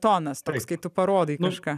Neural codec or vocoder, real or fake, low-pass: none; real; 14.4 kHz